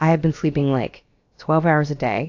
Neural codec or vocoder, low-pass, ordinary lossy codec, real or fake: codec, 16 kHz, about 1 kbps, DyCAST, with the encoder's durations; 7.2 kHz; AAC, 48 kbps; fake